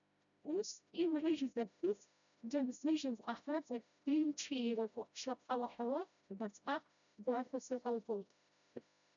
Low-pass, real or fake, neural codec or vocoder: 7.2 kHz; fake; codec, 16 kHz, 0.5 kbps, FreqCodec, smaller model